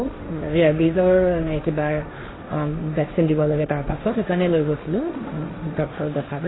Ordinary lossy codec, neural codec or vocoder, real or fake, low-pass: AAC, 16 kbps; codec, 16 kHz, 1.1 kbps, Voila-Tokenizer; fake; 7.2 kHz